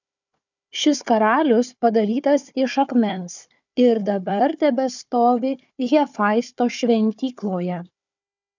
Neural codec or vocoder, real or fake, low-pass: codec, 16 kHz, 4 kbps, FunCodec, trained on Chinese and English, 50 frames a second; fake; 7.2 kHz